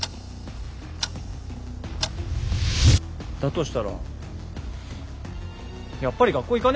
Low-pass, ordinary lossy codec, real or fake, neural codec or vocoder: none; none; real; none